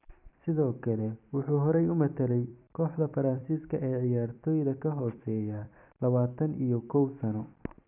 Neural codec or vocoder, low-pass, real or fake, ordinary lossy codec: vocoder, 44.1 kHz, 128 mel bands every 256 samples, BigVGAN v2; 3.6 kHz; fake; none